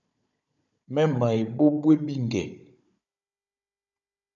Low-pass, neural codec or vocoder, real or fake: 7.2 kHz; codec, 16 kHz, 16 kbps, FunCodec, trained on Chinese and English, 50 frames a second; fake